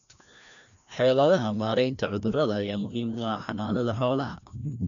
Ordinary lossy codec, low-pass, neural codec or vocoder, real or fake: none; 7.2 kHz; codec, 16 kHz, 1 kbps, FreqCodec, larger model; fake